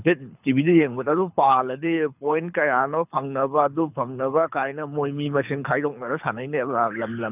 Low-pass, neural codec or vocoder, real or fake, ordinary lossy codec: 3.6 kHz; codec, 24 kHz, 6 kbps, HILCodec; fake; none